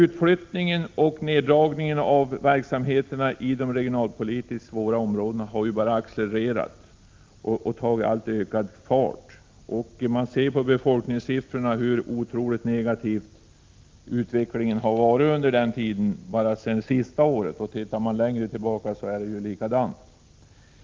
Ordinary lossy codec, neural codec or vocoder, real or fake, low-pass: Opus, 32 kbps; none; real; 7.2 kHz